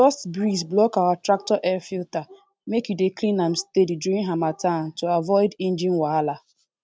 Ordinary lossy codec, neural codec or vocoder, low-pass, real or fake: none; none; none; real